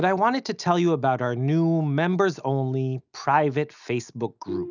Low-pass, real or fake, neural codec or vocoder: 7.2 kHz; real; none